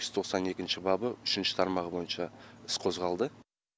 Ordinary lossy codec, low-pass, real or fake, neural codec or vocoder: none; none; real; none